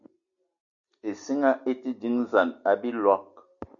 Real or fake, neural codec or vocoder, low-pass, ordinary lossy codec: real; none; 7.2 kHz; MP3, 64 kbps